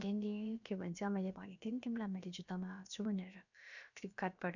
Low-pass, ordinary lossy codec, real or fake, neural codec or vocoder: 7.2 kHz; none; fake; codec, 16 kHz, about 1 kbps, DyCAST, with the encoder's durations